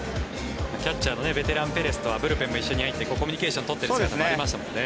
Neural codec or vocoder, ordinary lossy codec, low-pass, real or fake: none; none; none; real